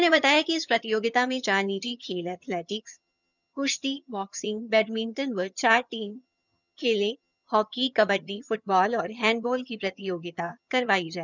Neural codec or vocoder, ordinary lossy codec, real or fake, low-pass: vocoder, 22.05 kHz, 80 mel bands, HiFi-GAN; none; fake; 7.2 kHz